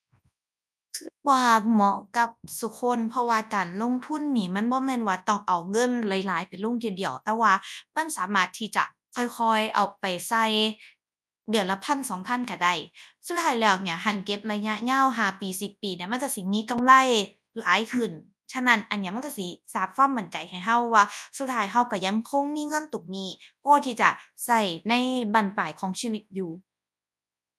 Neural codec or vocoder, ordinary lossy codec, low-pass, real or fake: codec, 24 kHz, 0.9 kbps, WavTokenizer, large speech release; none; none; fake